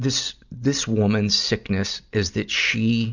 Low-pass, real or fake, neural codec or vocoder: 7.2 kHz; real; none